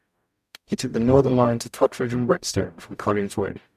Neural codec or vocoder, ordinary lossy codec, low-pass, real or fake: codec, 44.1 kHz, 0.9 kbps, DAC; none; 14.4 kHz; fake